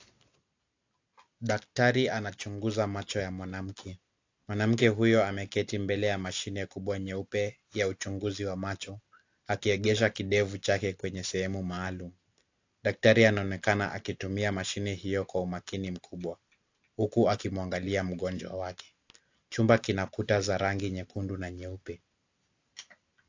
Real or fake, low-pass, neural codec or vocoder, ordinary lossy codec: real; 7.2 kHz; none; AAC, 48 kbps